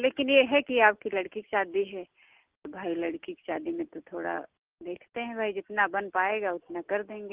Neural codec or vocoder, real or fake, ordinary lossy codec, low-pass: none; real; Opus, 16 kbps; 3.6 kHz